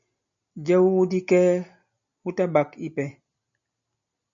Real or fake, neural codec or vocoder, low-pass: real; none; 7.2 kHz